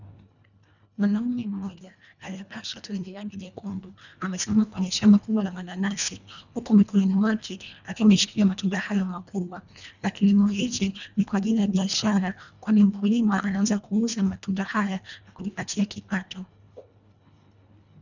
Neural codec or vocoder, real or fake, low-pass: codec, 24 kHz, 1.5 kbps, HILCodec; fake; 7.2 kHz